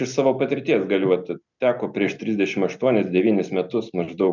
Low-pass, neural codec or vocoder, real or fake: 7.2 kHz; none; real